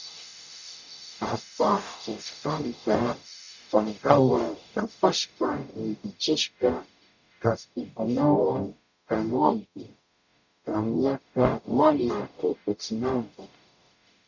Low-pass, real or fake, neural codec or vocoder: 7.2 kHz; fake; codec, 44.1 kHz, 0.9 kbps, DAC